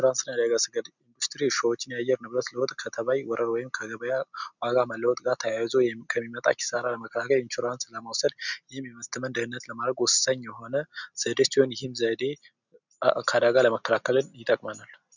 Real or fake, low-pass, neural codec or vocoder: real; 7.2 kHz; none